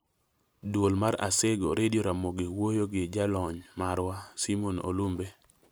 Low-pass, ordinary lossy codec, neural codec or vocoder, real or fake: none; none; none; real